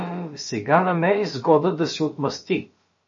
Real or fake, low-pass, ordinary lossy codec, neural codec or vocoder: fake; 7.2 kHz; MP3, 32 kbps; codec, 16 kHz, about 1 kbps, DyCAST, with the encoder's durations